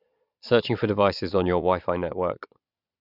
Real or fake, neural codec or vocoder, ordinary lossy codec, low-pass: fake; vocoder, 22.05 kHz, 80 mel bands, Vocos; none; 5.4 kHz